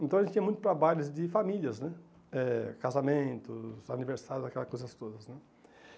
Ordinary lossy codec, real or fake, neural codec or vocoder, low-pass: none; real; none; none